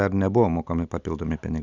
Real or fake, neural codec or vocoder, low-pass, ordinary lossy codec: real; none; 7.2 kHz; Opus, 64 kbps